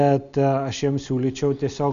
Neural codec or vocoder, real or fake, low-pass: none; real; 7.2 kHz